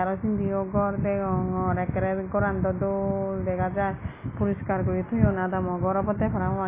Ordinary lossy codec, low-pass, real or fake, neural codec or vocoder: AAC, 16 kbps; 3.6 kHz; real; none